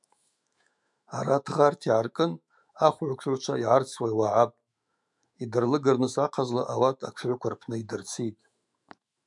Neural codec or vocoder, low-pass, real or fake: autoencoder, 48 kHz, 128 numbers a frame, DAC-VAE, trained on Japanese speech; 10.8 kHz; fake